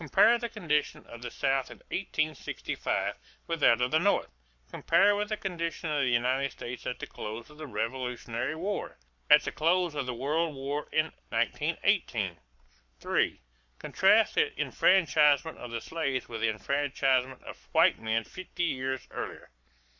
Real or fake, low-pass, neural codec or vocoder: fake; 7.2 kHz; codec, 44.1 kHz, 7.8 kbps, Pupu-Codec